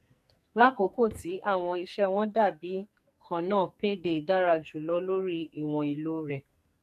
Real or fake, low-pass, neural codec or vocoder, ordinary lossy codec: fake; 14.4 kHz; codec, 44.1 kHz, 2.6 kbps, SNAC; AAC, 96 kbps